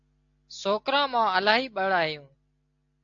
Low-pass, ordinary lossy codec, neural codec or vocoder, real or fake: 7.2 kHz; AAC, 48 kbps; none; real